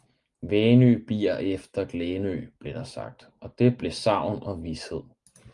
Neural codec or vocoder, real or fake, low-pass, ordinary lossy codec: none; real; 10.8 kHz; Opus, 32 kbps